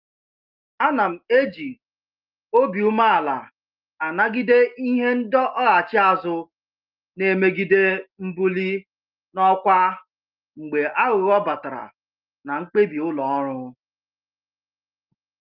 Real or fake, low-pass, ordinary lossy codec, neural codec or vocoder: real; 5.4 kHz; Opus, 32 kbps; none